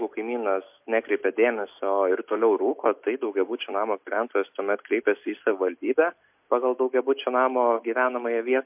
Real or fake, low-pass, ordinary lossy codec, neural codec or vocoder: real; 3.6 kHz; MP3, 32 kbps; none